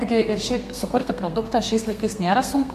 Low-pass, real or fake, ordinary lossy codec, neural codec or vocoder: 14.4 kHz; fake; MP3, 64 kbps; codec, 32 kHz, 1.9 kbps, SNAC